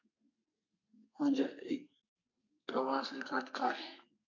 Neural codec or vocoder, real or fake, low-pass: codec, 32 kHz, 1.9 kbps, SNAC; fake; 7.2 kHz